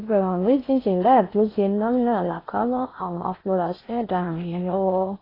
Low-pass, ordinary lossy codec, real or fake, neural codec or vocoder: 5.4 kHz; AAC, 24 kbps; fake; codec, 16 kHz in and 24 kHz out, 0.6 kbps, FocalCodec, streaming, 4096 codes